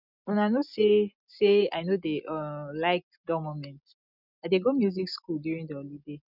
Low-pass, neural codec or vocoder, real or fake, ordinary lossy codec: 5.4 kHz; none; real; none